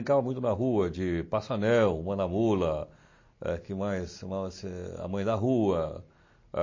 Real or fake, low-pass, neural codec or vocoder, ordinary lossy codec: real; 7.2 kHz; none; MP3, 32 kbps